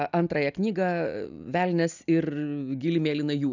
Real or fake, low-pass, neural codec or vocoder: real; 7.2 kHz; none